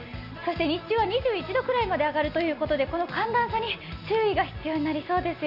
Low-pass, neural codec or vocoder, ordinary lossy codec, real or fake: 5.4 kHz; none; none; real